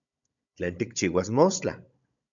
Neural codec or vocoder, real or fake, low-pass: codec, 16 kHz, 16 kbps, FunCodec, trained on Chinese and English, 50 frames a second; fake; 7.2 kHz